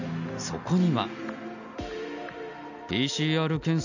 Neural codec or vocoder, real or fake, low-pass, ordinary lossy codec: none; real; 7.2 kHz; none